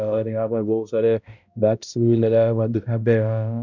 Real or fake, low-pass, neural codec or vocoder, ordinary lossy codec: fake; 7.2 kHz; codec, 16 kHz, 0.5 kbps, X-Codec, HuBERT features, trained on balanced general audio; none